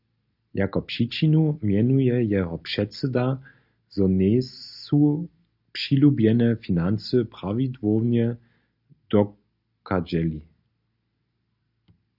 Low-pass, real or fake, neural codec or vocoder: 5.4 kHz; real; none